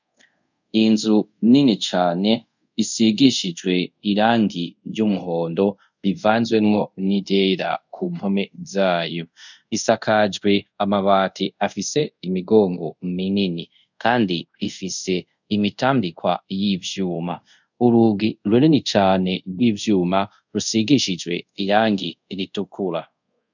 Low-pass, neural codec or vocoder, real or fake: 7.2 kHz; codec, 24 kHz, 0.5 kbps, DualCodec; fake